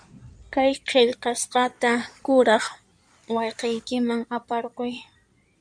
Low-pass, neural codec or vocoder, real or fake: 9.9 kHz; codec, 16 kHz in and 24 kHz out, 2.2 kbps, FireRedTTS-2 codec; fake